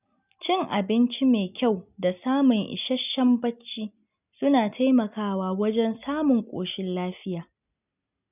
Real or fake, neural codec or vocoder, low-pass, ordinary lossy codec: real; none; 3.6 kHz; none